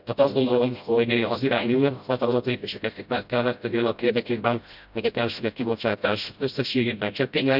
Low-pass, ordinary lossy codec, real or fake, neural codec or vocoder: 5.4 kHz; none; fake; codec, 16 kHz, 0.5 kbps, FreqCodec, smaller model